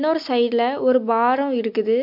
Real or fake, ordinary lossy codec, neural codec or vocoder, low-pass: real; MP3, 48 kbps; none; 5.4 kHz